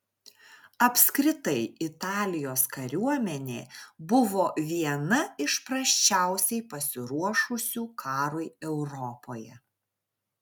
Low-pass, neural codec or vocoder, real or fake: 19.8 kHz; none; real